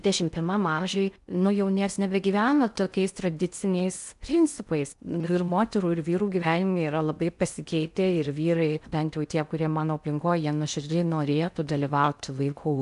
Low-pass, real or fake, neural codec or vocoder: 10.8 kHz; fake; codec, 16 kHz in and 24 kHz out, 0.6 kbps, FocalCodec, streaming, 4096 codes